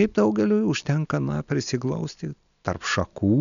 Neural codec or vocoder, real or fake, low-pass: none; real; 7.2 kHz